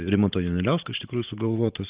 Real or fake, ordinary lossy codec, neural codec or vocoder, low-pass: fake; Opus, 32 kbps; vocoder, 44.1 kHz, 128 mel bands every 512 samples, BigVGAN v2; 3.6 kHz